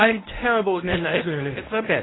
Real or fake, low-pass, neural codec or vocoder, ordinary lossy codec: fake; 7.2 kHz; codec, 24 kHz, 0.9 kbps, WavTokenizer, medium speech release version 1; AAC, 16 kbps